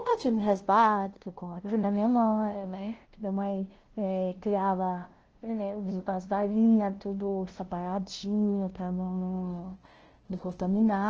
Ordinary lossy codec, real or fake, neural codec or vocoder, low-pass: Opus, 16 kbps; fake; codec, 16 kHz, 0.5 kbps, FunCodec, trained on LibriTTS, 25 frames a second; 7.2 kHz